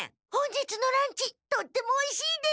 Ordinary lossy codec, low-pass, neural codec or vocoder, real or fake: none; none; none; real